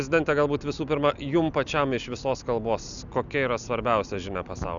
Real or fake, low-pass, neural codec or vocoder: real; 7.2 kHz; none